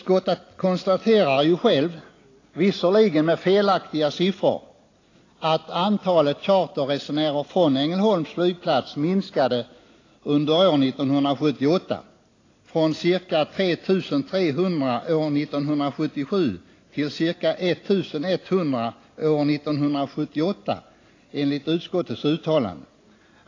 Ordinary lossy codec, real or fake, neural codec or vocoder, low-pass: AAC, 32 kbps; real; none; 7.2 kHz